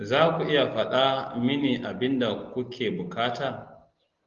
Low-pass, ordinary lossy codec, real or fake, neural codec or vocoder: 7.2 kHz; Opus, 32 kbps; real; none